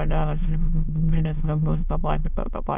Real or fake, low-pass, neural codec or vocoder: fake; 3.6 kHz; autoencoder, 22.05 kHz, a latent of 192 numbers a frame, VITS, trained on many speakers